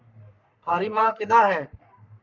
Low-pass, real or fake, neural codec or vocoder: 7.2 kHz; fake; codec, 44.1 kHz, 3.4 kbps, Pupu-Codec